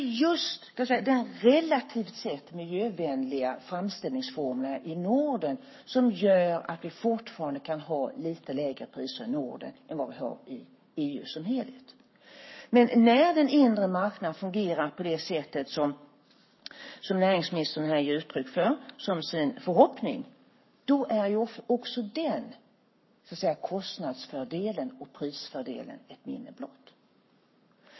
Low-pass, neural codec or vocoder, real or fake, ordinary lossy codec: 7.2 kHz; codec, 44.1 kHz, 7.8 kbps, Pupu-Codec; fake; MP3, 24 kbps